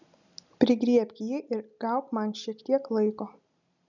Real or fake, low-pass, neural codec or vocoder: real; 7.2 kHz; none